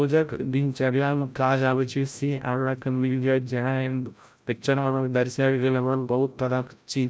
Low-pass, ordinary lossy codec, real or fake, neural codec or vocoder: none; none; fake; codec, 16 kHz, 0.5 kbps, FreqCodec, larger model